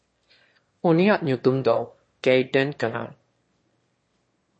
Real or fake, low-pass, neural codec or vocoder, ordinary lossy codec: fake; 9.9 kHz; autoencoder, 22.05 kHz, a latent of 192 numbers a frame, VITS, trained on one speaker; MP3, 32 kbps